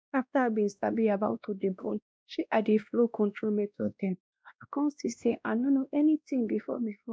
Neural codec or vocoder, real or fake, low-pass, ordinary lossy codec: codec, 16 kHz, 1 kbps, X-Codec, WavLM features, trained on Multilingual LibriSpeech; fake; none; none